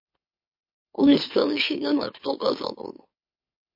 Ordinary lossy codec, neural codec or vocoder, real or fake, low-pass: MP3, 32 kbps; autoencoder, 44.1 kHz, a latent of 192 numbers a frame, MeloTTS; fake; 5.4 kHz